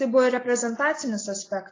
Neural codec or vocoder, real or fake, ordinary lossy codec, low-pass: none; real; AAC, 32 kbps; 7.2 kHz